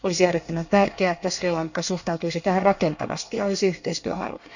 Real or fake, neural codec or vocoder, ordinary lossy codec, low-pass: fake; codec, 24 kHz, 1 kbps, SNAC; none; 7.2 kHz